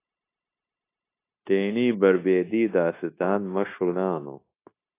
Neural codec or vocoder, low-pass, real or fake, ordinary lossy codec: codec, 16 kHz, 0.9 kbps, LongCat-Audio-Codec; 3.6 kHz; fake; AAC, 24 kbps